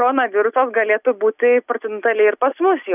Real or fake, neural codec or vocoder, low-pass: real; none; 3.6 kHz